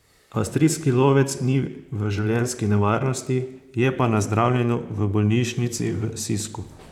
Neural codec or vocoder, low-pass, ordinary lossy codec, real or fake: vocoder, 44.1 kHz, 128 mel bands, Pupu-Vocoder; 19.8 kHz; none; fake